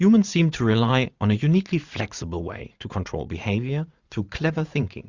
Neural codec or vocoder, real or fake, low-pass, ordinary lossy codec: vocoder, 44.1 kHz, 80 mel bands, Vocos; fake; 7.2 kHz; Opus, 64 kbps